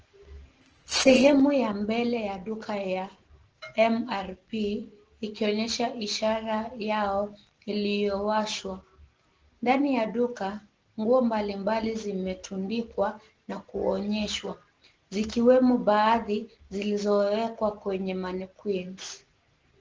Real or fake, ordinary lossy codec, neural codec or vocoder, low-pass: real; Opus, 16 kbps; none; 7.2 kHz